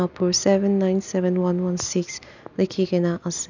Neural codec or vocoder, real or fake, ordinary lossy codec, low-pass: none; real; none; 7.2 kHz